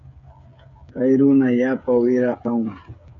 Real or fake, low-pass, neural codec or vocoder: fake; 7.2 kHz; codec, 16 kHz, 8 kbps, FreqCodec, smaller model